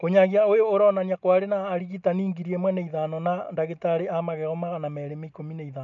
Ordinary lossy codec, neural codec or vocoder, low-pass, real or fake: none; none; 7.2 kHz; real